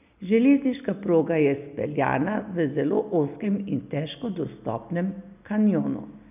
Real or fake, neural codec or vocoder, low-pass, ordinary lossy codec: real; none; 3.6 kHz; none